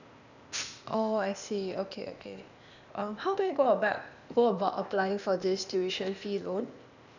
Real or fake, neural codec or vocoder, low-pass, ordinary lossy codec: fake; codec, 16 kHz, 0.8 kbps, ZipCodec; 7.2 kHz; none